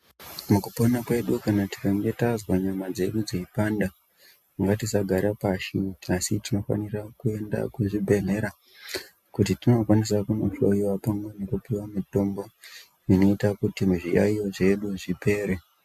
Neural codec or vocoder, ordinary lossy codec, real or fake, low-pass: none; MP3, 96 kbps; real; 14.4 kHz